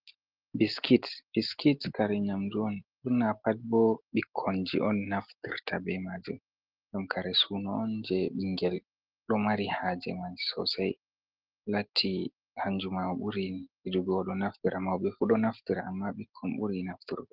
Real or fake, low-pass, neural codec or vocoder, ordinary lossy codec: real; 5.4 kHz; none; Opus, 16 kbps